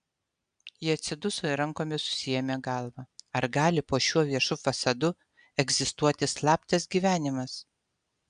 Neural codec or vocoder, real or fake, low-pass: none; real; 9.9 kHz